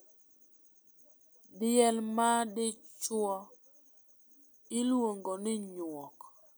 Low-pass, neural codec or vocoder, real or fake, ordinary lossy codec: none; none; real; none